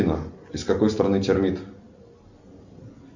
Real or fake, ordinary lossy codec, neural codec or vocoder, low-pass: real; Opus, 64 kbps; none; 7.2 kHz